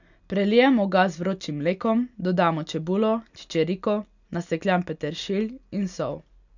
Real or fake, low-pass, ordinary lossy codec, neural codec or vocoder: real; 7.2 kHz; none; none